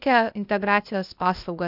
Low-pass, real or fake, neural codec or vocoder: 5.4 kHz; fake; codec, 16 kHz, 0.8 kbps, ZipCodec